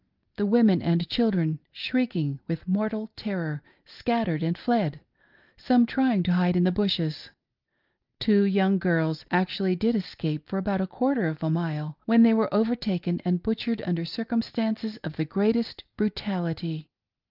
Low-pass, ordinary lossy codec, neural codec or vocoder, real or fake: 5.4 kHz; Opus, 24 kbps; none; real